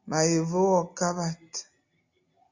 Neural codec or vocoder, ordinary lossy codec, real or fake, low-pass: none; Opus, 64 kbps; real; 7.2 kHz